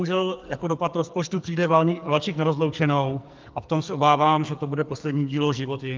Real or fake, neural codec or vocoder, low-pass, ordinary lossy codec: fake; codec, 44.1 kHz, 2.6 kbps, SNAC; 7.2 kHz; Opus, 24 kbps